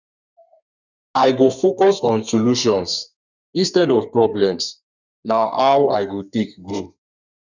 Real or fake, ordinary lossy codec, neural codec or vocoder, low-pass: fake; none; codec, 32 kHz, 1.9 kbps, SNAC; 7.2 kHz